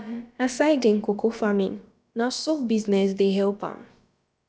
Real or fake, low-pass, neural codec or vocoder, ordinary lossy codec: fake; none; codec, 16 kHz, about 1 kbps, DyCAST, with the encoder's durations; none